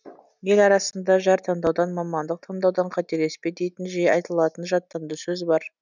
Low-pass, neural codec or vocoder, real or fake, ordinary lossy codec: 7.2 kHz; none; real; none